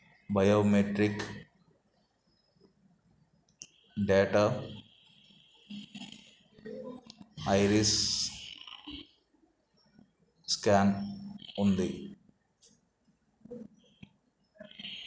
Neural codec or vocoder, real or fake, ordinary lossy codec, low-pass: none; real; none; none